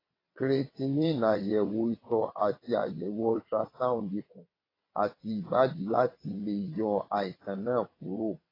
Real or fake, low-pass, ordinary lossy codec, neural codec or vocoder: fake; 5.4 kHz; AAC, 24 kbps; vocoder, 22.05 kHz, 80 mel bands, WaveNeXt